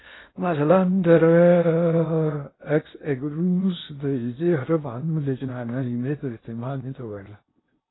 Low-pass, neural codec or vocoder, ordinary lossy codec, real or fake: 7.2 kHz; codec, 16 kHz in and 24 kHz out, 0.6 kbps, FocalCodec, streaming, 2048 codes; AAC, 16 kbps; fake